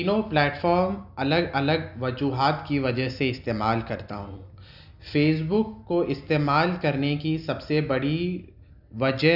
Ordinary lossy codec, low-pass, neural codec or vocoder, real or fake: none; 5.4 kHz; none; real